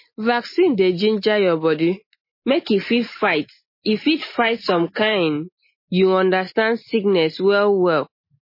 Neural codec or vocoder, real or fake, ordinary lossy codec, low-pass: none; real; MP3, 24 kbps; 5.4 kHz